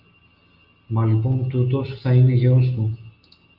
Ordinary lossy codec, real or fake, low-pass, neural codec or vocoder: Opus, 24 kbps; real; 5.4 kHz; none